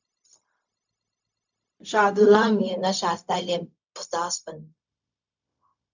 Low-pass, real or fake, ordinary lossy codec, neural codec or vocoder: 7.2 kHz; fake; none; codec, 16 kHz, 0.4 kbps, LongCat-Audio-Codec